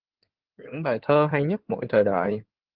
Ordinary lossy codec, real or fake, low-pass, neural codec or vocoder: Opus, 16 kbps; fake; 5.4 kHz; codec, 16 kHz in and 24 kHz out, 2.2 kbps, FireRedTTS-2 codec